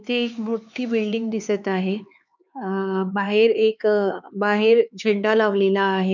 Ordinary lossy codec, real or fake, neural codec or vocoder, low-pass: none; fake; codec, 16 kHz, 4 kbps, X-Codec, HuBERT features, trained on LibriSpeech; 7.2 kHz